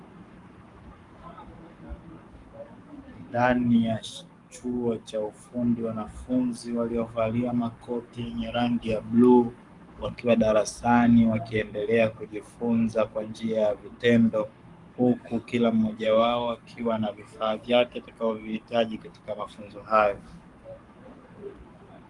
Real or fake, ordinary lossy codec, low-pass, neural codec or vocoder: fake; Opus, 32 kbps; 10.8 kHz; codec, 44.1 kHz, 7.8 kbps, Pupu-Codec